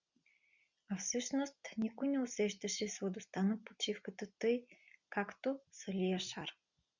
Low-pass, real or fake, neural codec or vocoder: 7.2 kHz; real; none